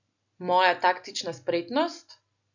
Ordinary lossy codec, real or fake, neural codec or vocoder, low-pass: none; real; none; 7.2 kHz